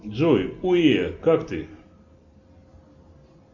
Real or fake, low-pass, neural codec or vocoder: real; 7.2 kHz; none